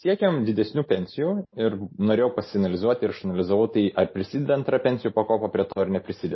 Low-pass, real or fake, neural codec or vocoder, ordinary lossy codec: 7.2 kHz; real; none; MP3, 24 kbps